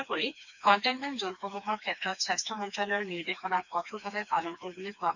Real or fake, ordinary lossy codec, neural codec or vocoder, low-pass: fake; none; codec, 16 kHz, 2 kbps, FreqCodec, smaller model; 7.2 kHz